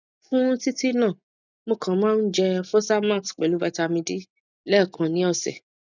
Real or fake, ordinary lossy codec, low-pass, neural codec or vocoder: real; none; 7.2 kHz; none